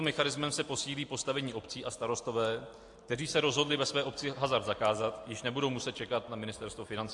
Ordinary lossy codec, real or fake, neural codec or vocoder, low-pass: AAC, 64 kbps; real; none; 10.8 kHz